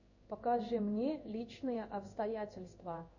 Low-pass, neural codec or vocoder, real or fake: 7.2 kHz; codec, 16 kHz in and 24 kHz out, 1 kbps, XY-Tokenizer; fake